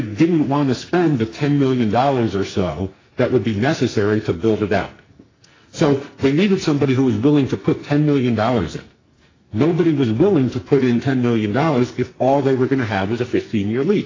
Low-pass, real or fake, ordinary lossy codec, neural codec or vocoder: 7.2 kHz; fake; AAC, 32 kbps; codec, 44.1 kHz, 2.6 kbps, SNAC